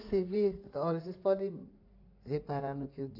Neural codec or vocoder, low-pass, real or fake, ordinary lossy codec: codec, 16 kHz in and 24 kHz out, 2.2 kbps, FireRedTTS-2 codec; 5.4 kHz; fake; AAC, 48 kbps